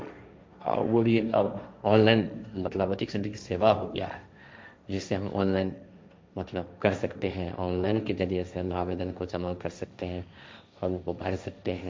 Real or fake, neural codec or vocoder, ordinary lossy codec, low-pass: fake; codec, 16 kHz, 1.1 kbps, Voila-Tokenizer; none; none